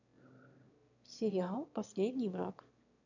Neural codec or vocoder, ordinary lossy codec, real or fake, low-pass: autoencoder, 22.05 kHz, a latent of 192 numbers a frame, VITS, trained on one speaker; none; fake; 7.2 kHz